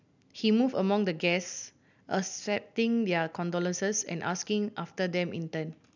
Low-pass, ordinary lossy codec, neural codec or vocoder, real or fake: 7.2 kHz; none; none; real